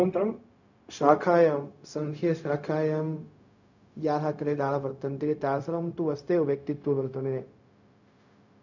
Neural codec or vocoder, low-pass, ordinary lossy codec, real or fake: codec, 16 kHz, 0.4 kbps, LongCat-Audio-Codec; 7.2 kHz; none; fake